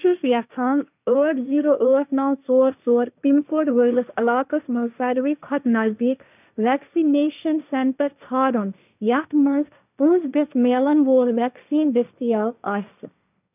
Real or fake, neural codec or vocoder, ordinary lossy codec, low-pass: fake; codec, 16 kHz, 1.1 kbps, Voila-Tokenizer; none; 3.6 kHz